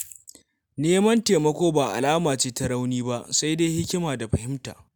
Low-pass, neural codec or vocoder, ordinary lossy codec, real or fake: none; none; none; real